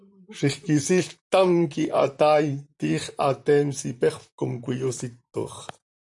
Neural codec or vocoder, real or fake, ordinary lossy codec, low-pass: vocoder, 44.1 kHz, 128 mel bands, Pupu-Vocoder; fake; MP3, 96 kbps; 10.8 kHz